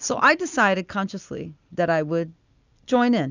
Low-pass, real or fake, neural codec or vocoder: 7.2 kHz; real; none